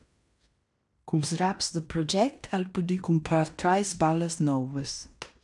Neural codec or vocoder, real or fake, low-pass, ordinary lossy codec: codec, 16 kHz in and 24 kHz out, 0.9 kbps, LongCat-Audio-Codec, fine tuned four codebook decoder; fake; 10.8 kHz; MP3, 96 kbps